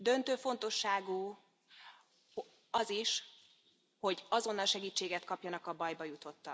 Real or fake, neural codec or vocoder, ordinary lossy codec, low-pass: real; none; none; none